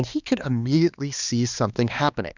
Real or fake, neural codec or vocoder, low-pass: fake; codec, 16 kHz, 2 kbps, X-Codec, HuBERT features, trained on balanced general audio; 7.2 kHz